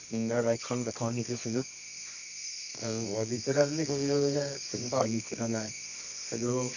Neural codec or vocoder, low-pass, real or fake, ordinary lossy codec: codec, 24 kHz, 0.9 kbps, WavTokenizer, medium music audio release; 7.2 kHz; fake; none